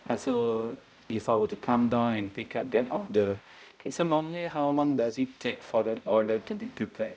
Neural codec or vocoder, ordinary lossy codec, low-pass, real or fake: codec, 16 kHz, 0.5 kbps, X-Codec, HuBERT features, trained on balanced general audio; none; none; fake